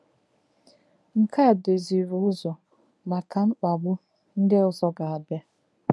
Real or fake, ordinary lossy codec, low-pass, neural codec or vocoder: fake; none; none; codec, 24 kHz, 0.9 kbps, WavTokenizer, medium speech release version 1